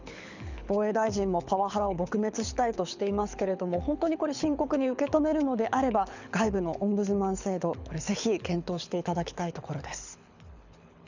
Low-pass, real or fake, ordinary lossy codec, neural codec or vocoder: 7.2 kHz; fake; none; codec, 24 kHz, 6 kbps, HILCodec